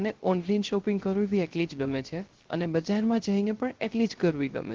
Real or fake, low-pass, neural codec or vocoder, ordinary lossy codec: fake; 7.2 kHz; codec, 16 kHz, 0.3 kbps, FocalCodec; Opus, 16 kbps